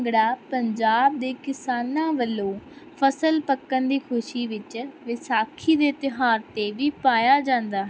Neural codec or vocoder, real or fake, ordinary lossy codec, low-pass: none; real; none; none